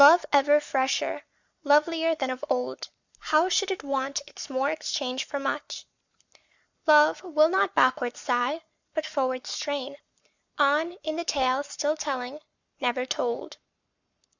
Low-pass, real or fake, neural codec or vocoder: 7.2 kHz; fake; codec, 44.1 kHz, 7.8 kbps, Pupu-Codec